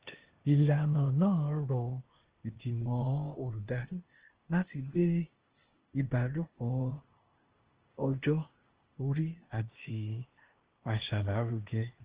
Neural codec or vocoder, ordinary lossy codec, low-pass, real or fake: codec, 16 kHz, 0.8 kbps, ZipCodec; Opus, 16 kbps; 3.6 kHz; fake